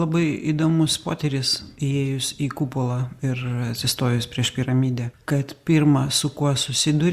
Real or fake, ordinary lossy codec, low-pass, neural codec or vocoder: real; Opus, 64 kbps; 14.4 kHz; none